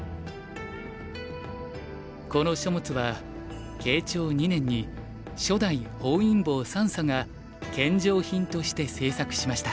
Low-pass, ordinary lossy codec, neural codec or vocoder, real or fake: none; none; none; real